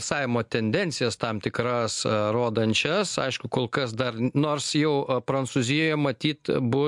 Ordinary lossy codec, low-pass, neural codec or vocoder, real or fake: MP3, 64 kbps; 10.8 kHz; none; real